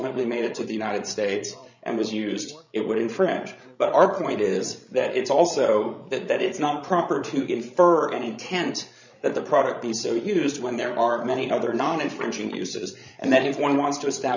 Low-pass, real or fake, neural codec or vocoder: 7.2 kHz; fake; codec, 16 kHz, 16 kbps, FreqCodec, larger model